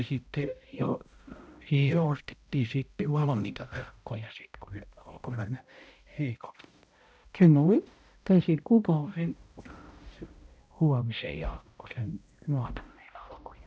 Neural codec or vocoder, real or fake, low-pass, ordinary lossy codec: codec, 16 kHz, 0.5 kbps, X-Codec, HuBERT features, trained on balanced general audio; fake; none; none